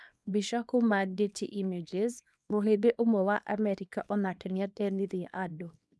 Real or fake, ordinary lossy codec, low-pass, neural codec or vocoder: fake; none; none; codec, 24 kHz, 0.9 kbps, WavTokenizer, small release